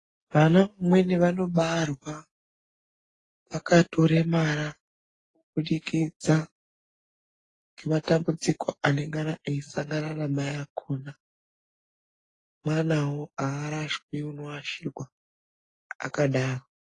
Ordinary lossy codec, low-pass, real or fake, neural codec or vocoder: AAC, 32 kbps; 10.8 kHz; real; none